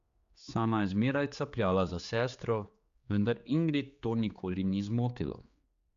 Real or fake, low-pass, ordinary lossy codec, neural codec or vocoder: fake; 7.2 kHz; Opus, 64 kbps; codec, 16 kHz, 4 kbps, X-Codec, HuBERT features, trained on general audio